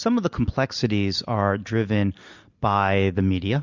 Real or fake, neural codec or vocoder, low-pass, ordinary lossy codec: real; none; 7.2 kHz; Opus, 64 kbps